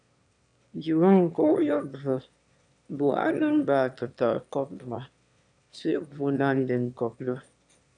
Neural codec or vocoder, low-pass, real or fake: autoencoder, 22.05 kHz, a latent of 192 numbers a frame, VITS, trained on one speaker; 9.9 kHz; fake